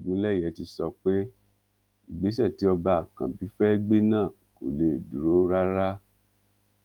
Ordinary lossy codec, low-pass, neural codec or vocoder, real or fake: Opus, 32 kbps; 19.8 kHz; autoencoder, 48 kHz, 128 numbers a frame, DAC-VAE, trained on Japanese speech; fake